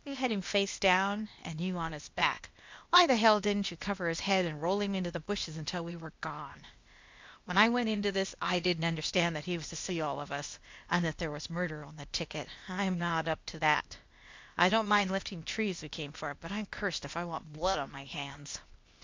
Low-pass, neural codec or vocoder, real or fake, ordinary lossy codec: 7.2 kHz; codec, 16 kHz, 0.8 kbps, ZipCodec; fake; MP3, 64 kbps